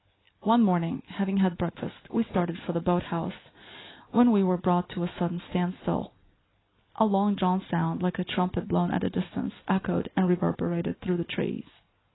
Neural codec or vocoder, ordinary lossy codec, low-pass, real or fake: none; AAC, 16 kbps; 7.2 kHz; real